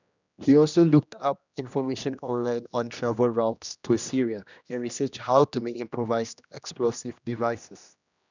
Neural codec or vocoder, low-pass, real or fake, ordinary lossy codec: codec, 16 kHz, 1 kbps, X-Codec, HuBERT features, trained on general audio; 7.2 kHz; fake; none